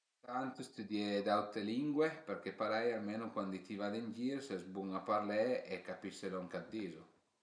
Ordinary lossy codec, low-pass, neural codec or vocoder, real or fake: none; 9.9 kHz; none; real